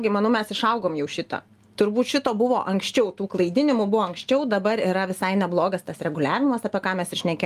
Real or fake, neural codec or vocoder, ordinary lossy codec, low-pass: real; none; Opus, 32 kbps; 14.4 kHz